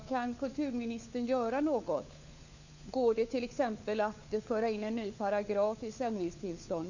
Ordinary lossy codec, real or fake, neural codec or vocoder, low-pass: none; fake; codec, 16 kHz, 4 kbps, FunCodec, trained on LibriTTS, 50 frames a second; 7.2 kHz